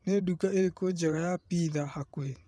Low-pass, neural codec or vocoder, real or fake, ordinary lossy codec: none; vocoder, 22.05 kHz, 80 mel bands, WaveNeXt; fake; none